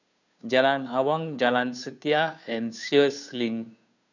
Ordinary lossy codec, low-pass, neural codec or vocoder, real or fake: none; 7.2 kHz; codec, 16 kHz, 2 kbps, FunCodec, trained on Chinese and English, 25 frames a second; fake